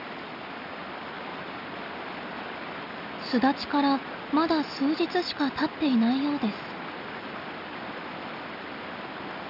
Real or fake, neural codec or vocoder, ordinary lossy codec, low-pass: real; none; none; 5.4 kHz